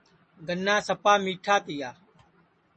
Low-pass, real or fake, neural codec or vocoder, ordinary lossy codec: 10.8 kHz; real; none; MP3, 32 kbps